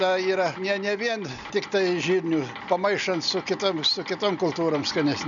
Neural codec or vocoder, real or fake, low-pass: none; real; 7.2 kHz